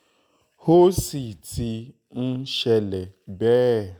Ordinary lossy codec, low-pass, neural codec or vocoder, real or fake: none; none; none; real